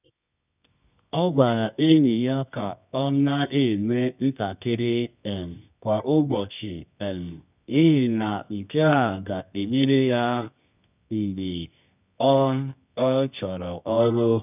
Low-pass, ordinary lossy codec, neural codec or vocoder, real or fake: 3.6 kHz; none; codec, 24 kHz, 0.9 kbps, WavTokenizer, medium music audio release; fake